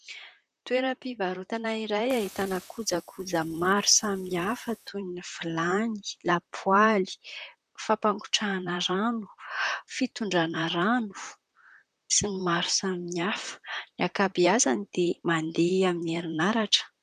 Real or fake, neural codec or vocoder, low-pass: fake; vocoder, 44.1 kHz, 128 mel bands, Pupu-Vocoder; 14.4 kHz